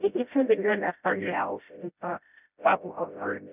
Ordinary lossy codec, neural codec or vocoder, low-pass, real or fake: none; codec, 16 kHz, 0.5 kbps, FreqCodec, smaller model; 3.6 kHz; fake